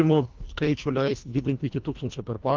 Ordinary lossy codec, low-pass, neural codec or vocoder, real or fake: Opus, 24 kbps; 7.2 kHz; codec, 24 kHz, 1.5 kbps, HILCodec; fake